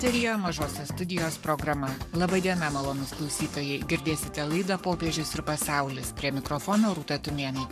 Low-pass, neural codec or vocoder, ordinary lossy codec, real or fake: 14.4 kHz; codec, 44.1 kHz, 7.8 kbps, Pupu-Codec; MP3, 96 kbps; fake